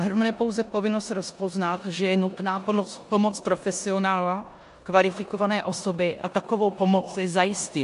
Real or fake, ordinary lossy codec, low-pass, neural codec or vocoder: fake; AAC, 64 kbps; 10.8 kHz; codec, 16 kHz in and 24 kHz out, 0.9 kbps, LongCat-Audio-Codec, four codebook decoder